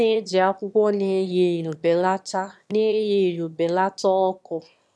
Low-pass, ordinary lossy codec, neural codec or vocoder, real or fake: none; none; autoencoder, 22.05 kHz, a latent of 192 numbers a frame, VITS, trained on one speaker; fake